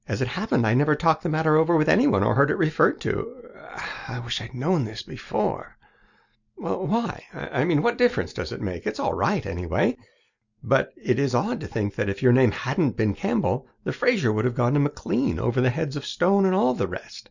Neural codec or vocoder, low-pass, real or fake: none; 7.2 kHz; real